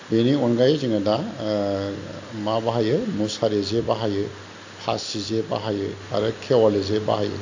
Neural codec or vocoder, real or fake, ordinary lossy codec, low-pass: none; real; AAC, 48 kbps; 7.2 kHz